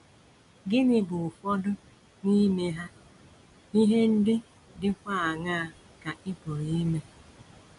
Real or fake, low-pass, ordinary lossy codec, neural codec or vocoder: real; 10.8 kHz; none; none